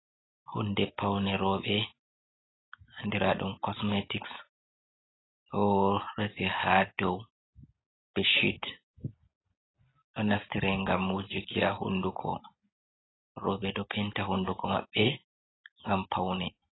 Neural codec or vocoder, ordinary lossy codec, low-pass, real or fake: none; AAC, 16 kbps; 7.2 kHz; real